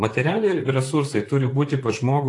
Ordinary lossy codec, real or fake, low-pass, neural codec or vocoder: AAC, 48 kbps; fake; 10.8 kHz; vocoder, 44.1 kHz, 128 mel bands, Pupu-Vocoder